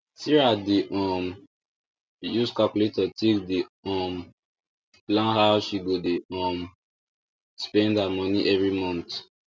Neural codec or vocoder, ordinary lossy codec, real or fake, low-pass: none; none; real; none